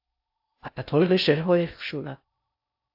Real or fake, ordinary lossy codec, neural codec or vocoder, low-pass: fake; AAC, 48 kbps; codec, 16 kHz in and 24 kHz out, 0.6 kbps, FocalCodec, streaming, 4096 codes; 5.4 kHz